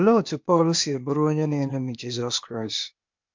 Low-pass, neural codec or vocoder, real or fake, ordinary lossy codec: 7.2 kHz; codec, 16 kHz, 0.8 kbps, ZipCodec; fake; MP3, 64 kbps